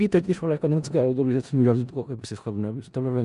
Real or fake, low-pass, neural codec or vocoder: fake; 10.8 kHz; codec, 16 kHz in and 24 kHz out, 0.4 kbps, LongCat-Audio-Codec, four codebook decoder